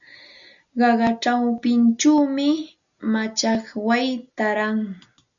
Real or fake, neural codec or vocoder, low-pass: real; none; 7.2 kHz